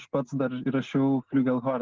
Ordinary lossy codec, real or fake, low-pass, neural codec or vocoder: Opus, 16 kbps; real; 7.2 kHz; none